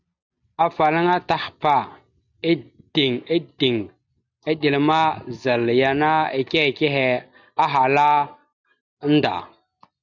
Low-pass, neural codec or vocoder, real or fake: 7.2 kHz; none; real